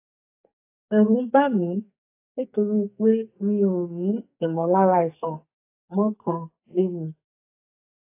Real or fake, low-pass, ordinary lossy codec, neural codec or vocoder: fake; 3.6 kHz; AAC, 24 kbps; codec, 44.1 kHz, 2.6 kbps, SNAC